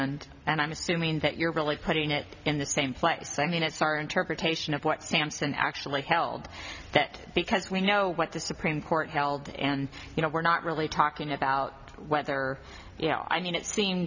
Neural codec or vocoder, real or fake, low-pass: none; real; 7.2 kHz